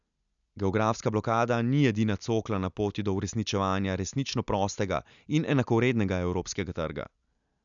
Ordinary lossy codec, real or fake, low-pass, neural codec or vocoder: none; real; 7.2 kHz; none